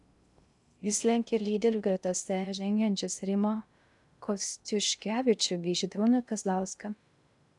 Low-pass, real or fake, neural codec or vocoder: 10.8 kHz; fake; codec, 16 kHz in and 24 kHz out, 0.6 kbps, FocalCodec, streaming, 2048 codes